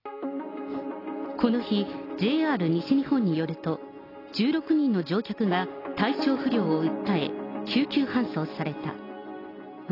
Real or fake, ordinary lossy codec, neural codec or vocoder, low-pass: real; AAC, 24 kbps; none; 5.4 kHz